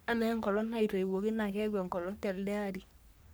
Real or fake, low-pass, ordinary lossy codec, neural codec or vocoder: fake; none; none; codec, 44.1 kHz, 3.4 kbps, Pupu-Codec